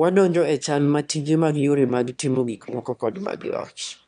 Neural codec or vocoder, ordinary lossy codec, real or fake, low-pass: autoencoder, 22.05 kHz, a latent of 192 numbers a frame, VITS, trained on one speaker; none; fake; 9.9 kHz